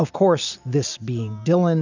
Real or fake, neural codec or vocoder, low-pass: real; none; 7.2 kHz